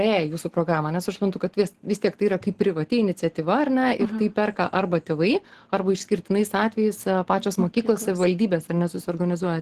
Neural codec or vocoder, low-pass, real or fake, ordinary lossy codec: none; 14.4 kHz; real; Opus, 16 kbps